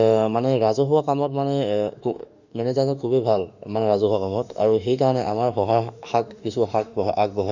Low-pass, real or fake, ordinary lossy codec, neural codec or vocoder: 7.2 kHz; fake; none; autoencoder, 48 kHz, 32 numbers a frame, DAC-VAE, trained on Japanese speech